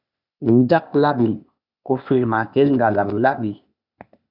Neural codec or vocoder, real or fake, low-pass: codec, 16 kHz, 0.8 kbps, ZipCodec; fake; 5.4 kHz